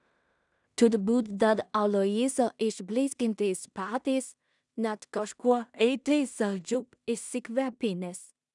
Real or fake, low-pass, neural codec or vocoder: fake; 10.8 kHz; codec, 16 kHz in and 24 kHz out, 0.4 kbps, LongCat-Audio-Codec, two codebook decoder